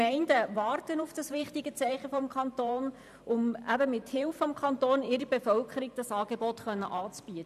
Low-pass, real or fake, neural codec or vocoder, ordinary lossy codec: 14.4 kHz; fake; vocoder, 44.1 kHz, 128 mel bands every 512 samples, BigVGAN v2; none